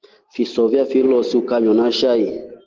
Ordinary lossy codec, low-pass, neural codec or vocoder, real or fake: Opus, 16 kbps; 7.2 kHz; none; real